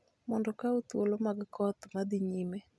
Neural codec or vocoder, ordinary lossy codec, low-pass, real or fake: none; none; none; real